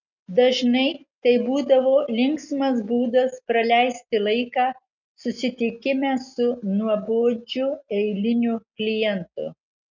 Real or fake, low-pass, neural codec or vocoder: real; 7.2 kHz; none